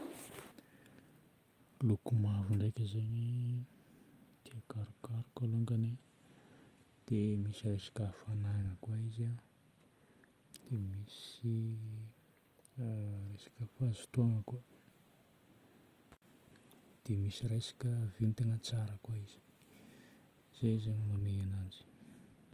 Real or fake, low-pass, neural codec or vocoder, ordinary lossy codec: real; 14.4 kHz; none; Opus, 32 kbps